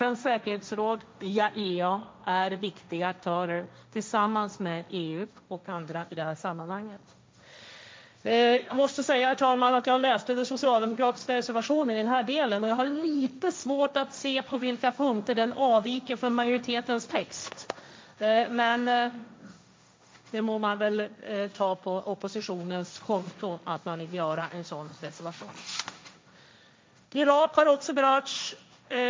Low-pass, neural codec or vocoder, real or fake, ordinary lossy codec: none; codec, 16 kHz, 1.1 kbps, Voila-Tokenizer; fake; none